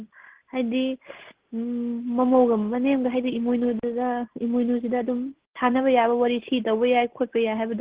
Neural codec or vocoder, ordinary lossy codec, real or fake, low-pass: none; Opus, 16 kbps; real; 3.6 kHz